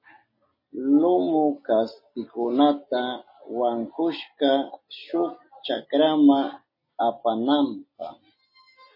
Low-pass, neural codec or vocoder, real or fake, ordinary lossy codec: 5.4 kHz; none; real; MP3, 24 kbps